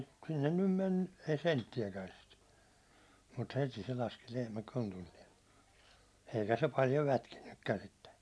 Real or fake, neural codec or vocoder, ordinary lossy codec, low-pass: real; none; none; none